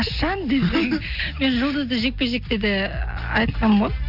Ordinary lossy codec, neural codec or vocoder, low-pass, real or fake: none; none; 5.4 kHz; real